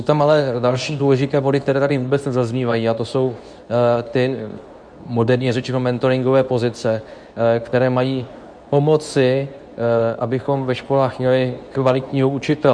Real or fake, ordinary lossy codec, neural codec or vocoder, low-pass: fake; MP3, 96 kbps; codec, 24 kHz, 0.9 kbps, WavTokenizer, medium speech release version 2; 9.9 kHz